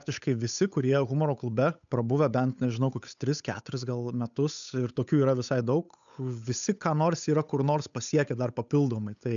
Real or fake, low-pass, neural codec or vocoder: fake; 7.2 kHz; codec, 16 kHz, 8 kbps, FunCodec, trained on Chinese and English, 25 frames a second